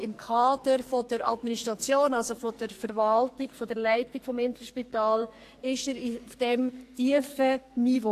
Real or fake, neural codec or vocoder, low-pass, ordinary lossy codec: fake; codec, 44.1 kHz, 2.6 kbps, SNAC; 14.4 kHz; AAC, 64 kbps